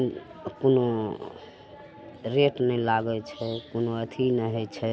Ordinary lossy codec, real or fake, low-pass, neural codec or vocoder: none; real; none; none